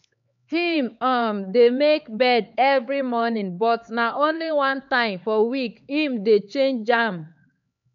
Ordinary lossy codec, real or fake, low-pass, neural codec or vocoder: MP3, 64 kbps; fake; 7.2 kHz; codec, 16 kHz, 4 kbps, X-Codec, HuBERT features, trained on LibriSpeech